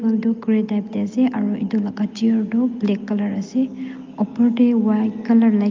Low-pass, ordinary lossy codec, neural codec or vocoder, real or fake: 7.2 kHz; Opus, 24 kbps; none; real